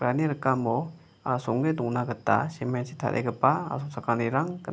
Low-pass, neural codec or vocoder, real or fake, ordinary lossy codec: none; none; real; none